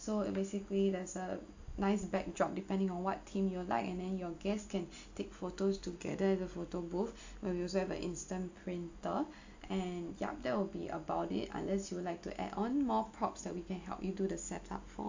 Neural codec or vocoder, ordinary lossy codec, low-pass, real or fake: none; AAC, 48 kbps; 7.2 kHz; real